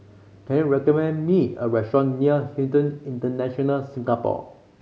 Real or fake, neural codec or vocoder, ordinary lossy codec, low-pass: real; none; none; none